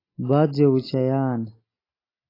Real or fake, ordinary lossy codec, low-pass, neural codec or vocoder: real; AAC, 24 kbps; 5.4 kHz; none